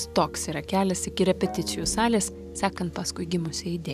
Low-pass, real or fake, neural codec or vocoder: 14.4 kHz; fake; vocoder, 44.1 kHz, 128 mel bands every 512 samples, BigVGAN v2